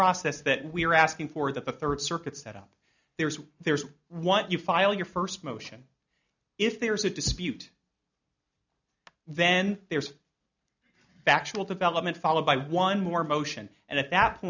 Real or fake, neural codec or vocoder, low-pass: real; none; 7.2 kHz